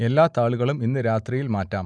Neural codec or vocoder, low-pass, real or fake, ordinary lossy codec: none; 9.9 kHz; real; none